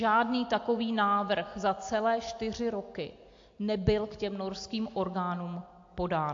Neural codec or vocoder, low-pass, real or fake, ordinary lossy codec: none; 7.2 kHz; real; AAC, 48 kbps